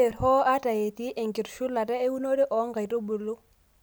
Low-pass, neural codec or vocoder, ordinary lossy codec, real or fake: none; none; none; real